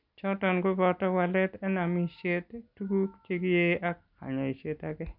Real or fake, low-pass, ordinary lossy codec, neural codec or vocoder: real; 5.4 kHz; none; none